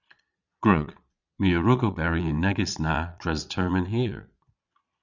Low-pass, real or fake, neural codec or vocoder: 7.2 kHz; fake; vocoder, 22.05 kHz, 80 mel bands, Vocos